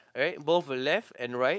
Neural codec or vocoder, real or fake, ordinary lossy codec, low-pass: codec, 16 kHz, 4.8 kbps, FACodec; fake; none; none